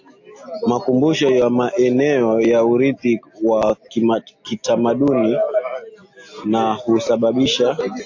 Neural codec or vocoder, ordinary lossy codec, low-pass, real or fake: none; AAC, 48 kbps; 7.2 kHz; real